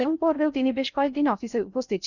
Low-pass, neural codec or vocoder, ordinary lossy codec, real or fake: 7.2 kHz; codec, 16 kHz in and 24 kHz out, 0.6 kbps, FocalCodec, streaming, 4096 codes; none; fake